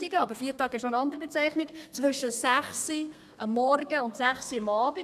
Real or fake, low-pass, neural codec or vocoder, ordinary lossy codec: fake; 14.4 kHz; codec, 32 kHz, 1.9 kbps, SNAC; none